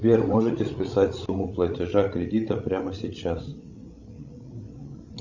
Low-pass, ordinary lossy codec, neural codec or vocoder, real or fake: 7.2 kHz; Opus, 64 kbps; codec, 16 kHz, 16 kbps, FreqCodec, larger model; fake